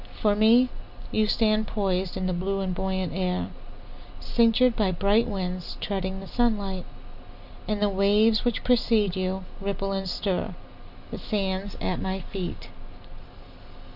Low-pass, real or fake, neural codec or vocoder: 5.4 kHz; real; none